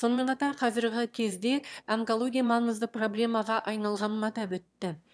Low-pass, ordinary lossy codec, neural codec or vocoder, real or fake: none; none; autoencoder, 22.05 kHz, a latent of 192 numbers a frame, VITS, trained on one speaker; fake